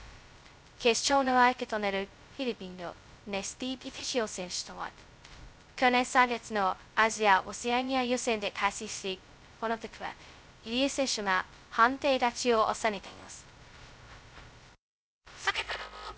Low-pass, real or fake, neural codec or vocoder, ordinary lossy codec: none; fake; codec, 16 kHz, 0.2 kbps, FocalCodec; none